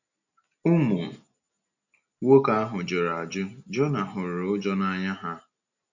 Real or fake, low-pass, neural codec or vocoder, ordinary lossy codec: real; 7.2 kHz; none; none